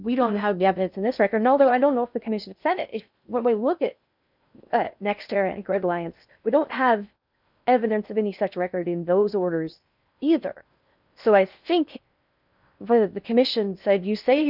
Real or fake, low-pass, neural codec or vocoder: fake; 5.4 kHz; codec, 16 kHz in and 24 kHz out, 0.6 kbps, FocalCodec, streaming, 2048 codes